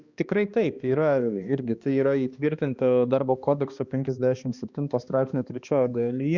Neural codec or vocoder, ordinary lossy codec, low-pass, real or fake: codec, 16 kHz, 2 kbps, X-Codec, HuBERT features, trained on balanced general audio; Opus, 64 kbps; 7.2 kHz; fake